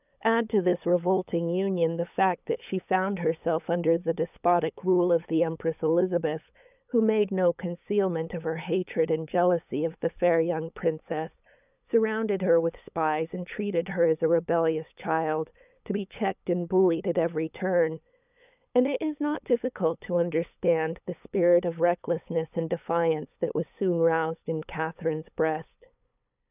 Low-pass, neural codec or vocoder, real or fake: 3.6 kHz; codec, 16 kHz, 8 kbps, FunCodec, trained on LibriTTS, 25 frames a second; fake